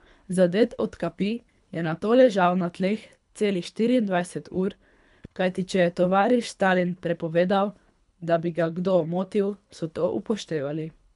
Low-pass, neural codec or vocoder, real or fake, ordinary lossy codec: 10.8 kHz; codec, 24 kHz, 3 kbps, HILCodec; fake; none